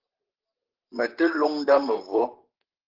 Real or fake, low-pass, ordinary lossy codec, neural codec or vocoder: fake; 5.4 kHz; Opus, 16 kbps; vocoder, 44.1 kHz, 128 mel bands, Pupu-Vocoder